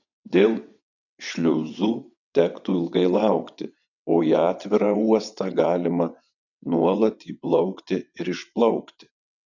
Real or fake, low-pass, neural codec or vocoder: fake; 7.2 kHz; vocoder, 22.05 kHz, 80 mel bands, WaveNeXt